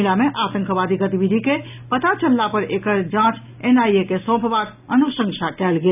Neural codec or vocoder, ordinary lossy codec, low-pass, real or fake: none; none; 3.6 kHz; real